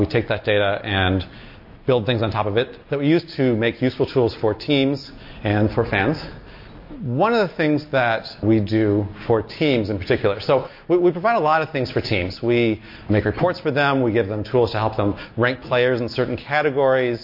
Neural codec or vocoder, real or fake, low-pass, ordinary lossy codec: none; real; 5.4 kHz; MP3, 32 kbps